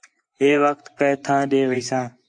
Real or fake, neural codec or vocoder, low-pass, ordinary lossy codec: fake; vocoder, 24 kHz, 100 mel bands, Vocos; 9.9 kHz; AAC, 64 kbps